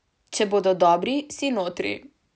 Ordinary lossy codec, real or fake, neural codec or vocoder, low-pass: none; real; none; none